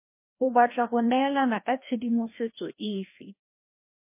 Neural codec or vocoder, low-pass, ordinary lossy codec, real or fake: codec, 16 kHz, 1 kbps, FreqCodec, larger model; 3.6 kHz; MP3, 24 kbps; fake